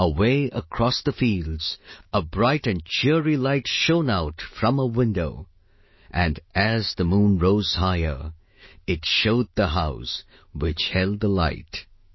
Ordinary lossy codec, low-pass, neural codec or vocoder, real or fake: MP3, 24 kbps; 7.2 kHz; autoencoder, 48 kHz, 128 numbers a frame, DAC-VAE, trained on Japanese speech; fake